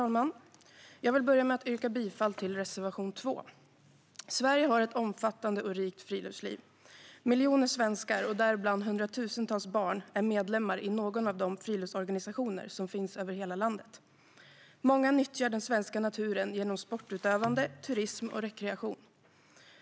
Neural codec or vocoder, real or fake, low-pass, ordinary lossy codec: none; real; none; none